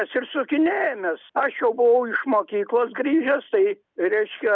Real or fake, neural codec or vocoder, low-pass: real; none; 7.2 kHz